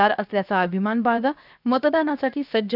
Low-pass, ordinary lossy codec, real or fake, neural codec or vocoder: 5.4 kHz; none; fake; codec, 16 kHz, 0.7 kbps, FocalCodec